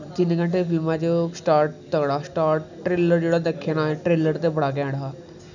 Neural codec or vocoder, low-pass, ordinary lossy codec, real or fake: none; 7.2 kHz; none; real